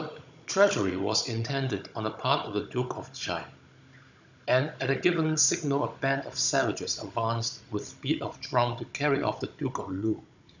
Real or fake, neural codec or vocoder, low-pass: fake; codec, 16 kHz, 16 kbps, FunCodec, trained on Chinese and English, 50 frames a second; 7.2 kHz